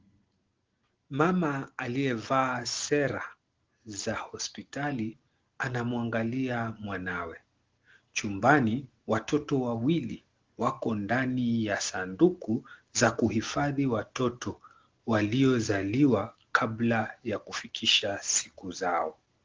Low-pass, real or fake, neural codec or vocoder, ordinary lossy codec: 7.2 kHz; real; none; Opus, 16 kbps